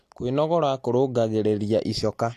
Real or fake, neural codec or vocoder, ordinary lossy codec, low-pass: real; none; AAC, 64 kbps; 14.4 kHz